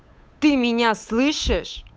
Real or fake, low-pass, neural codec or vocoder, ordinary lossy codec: fake; none; codec, 16 kHz, 8 kbps, FunCodec, trained on Chinese and English, 25 frames a second; none